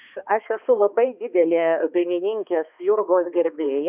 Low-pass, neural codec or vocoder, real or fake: 3.6 kHz; codec, 16 kHz, 2 kbps, X-Codec, HuBERT features, trained on balanced general audio; fake